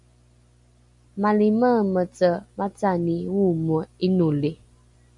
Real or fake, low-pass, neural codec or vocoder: real; 10.8 kHz; none